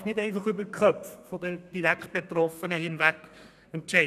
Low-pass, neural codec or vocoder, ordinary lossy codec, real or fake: 14.4 kHz; codec, 32 kHz, 1.9 kbps, SNAC; none; fake